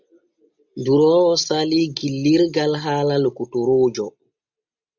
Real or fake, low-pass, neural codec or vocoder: real; 7.2 kHz; none